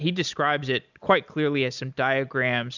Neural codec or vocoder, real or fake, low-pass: none; real; 7.2 kHz